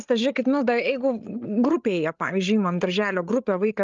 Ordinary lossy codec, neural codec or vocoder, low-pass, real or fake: Opus, 24 kbps; codec, 16 kHz, 8 kbps, FreqCodec, larger model; 7.2 kHz; fake